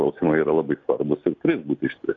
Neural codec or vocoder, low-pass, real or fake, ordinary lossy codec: none; 7.2 kHz; real; AAC, 48 kbps